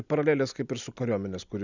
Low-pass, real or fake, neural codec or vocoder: 7.2 kHz; real; none